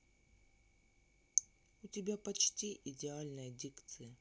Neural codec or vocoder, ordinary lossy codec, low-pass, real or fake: none; none; none; real